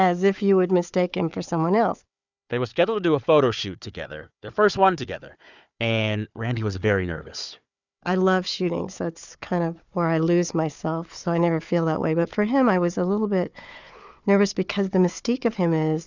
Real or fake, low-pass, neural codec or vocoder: fake; 7.2 kHz; codec, 16 kHz, 4 kbps, FunCodec, trained on Chinese and English, 50 frames a second